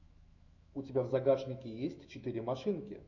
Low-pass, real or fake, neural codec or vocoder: 7.2 kHz; fake; autoencoder, 48 kHz, 128 numbers a frame, DAC-VAE, trained on Japanese speech